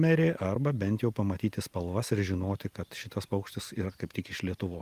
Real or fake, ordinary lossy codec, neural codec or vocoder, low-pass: real; Opus, 32 kbps; none; 14.4 kHz